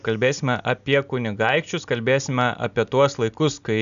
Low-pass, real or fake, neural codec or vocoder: 7.2 kHz; real; none